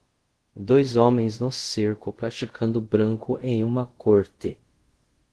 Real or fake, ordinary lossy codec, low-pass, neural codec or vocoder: fake; Opus, 16 kbps; 10.8 kHz; codec, 24 kHz, 0.5 kbps, DualCodec